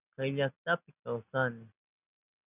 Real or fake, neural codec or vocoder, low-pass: real; none; 3.6 kHz